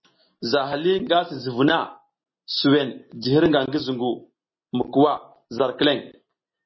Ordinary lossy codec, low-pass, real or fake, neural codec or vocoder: MP3, 24 kbps; 7.2 kHz; real; none